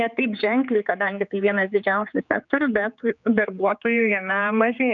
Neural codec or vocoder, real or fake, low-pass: codec, 16 kHz, 4 kbps, X-Codec, HuBERT features, trained on general audio; fake; 7.2 kHz